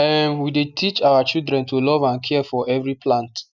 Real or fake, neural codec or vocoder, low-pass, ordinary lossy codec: real; none; 7.2 kHz; none